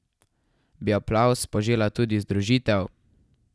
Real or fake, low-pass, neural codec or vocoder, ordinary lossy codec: real; none; none; none